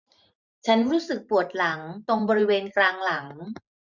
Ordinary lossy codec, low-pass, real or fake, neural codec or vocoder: none; 7.2 kHz; fake; vocoder, 44.1 kHz, 128 mel bands every 512 samples, BigVGAN v2